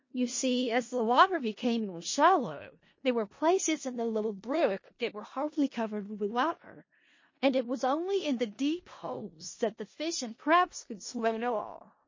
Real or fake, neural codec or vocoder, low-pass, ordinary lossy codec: fake; codec, 16 kHz in and 24 kHz out, 0.4 kbps, LongCat-Audio-Codec, four codebook decoder; 7.2 kHz; MP3, 32 kbps